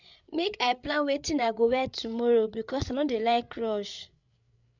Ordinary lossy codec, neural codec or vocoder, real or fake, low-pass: none; codec, 16 kHz, 8 kbps, FreqCodec, larger model; fake; 7.2 kHz